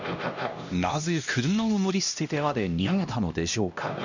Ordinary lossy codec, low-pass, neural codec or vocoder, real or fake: none; 7.2 kHz; codec, 16 kHz, 1 kbps, X-Codec, HuBERT features, trained on LibriSpeech; fake